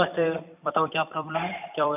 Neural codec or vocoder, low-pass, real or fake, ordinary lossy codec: vocoder, 44.1 kHz, 128 mel bands every 256 samples, BigVGAN v2; 3.6 kHz; fake; none